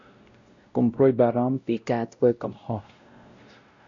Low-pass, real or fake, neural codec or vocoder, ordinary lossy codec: 7.2 kHz; fake; codec, 16 kHz, 0.5 kbps, X-Codec, WavLM features, trained on Multilingual LibriSpeech; MP3, 64 kbps